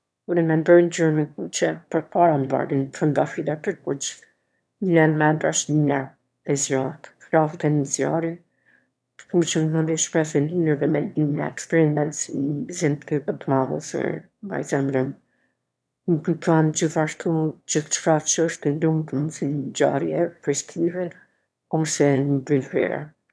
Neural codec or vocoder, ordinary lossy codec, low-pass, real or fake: autoencoder, 22.05 kHz, a latent of 192 numbers a frame, VITS, trained on one speaker; none; none; fake